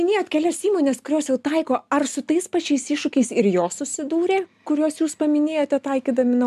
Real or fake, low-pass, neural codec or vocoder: real; 14.4 kHz; none